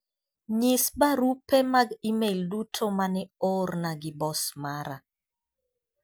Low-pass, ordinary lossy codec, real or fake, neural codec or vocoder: none; none; real; none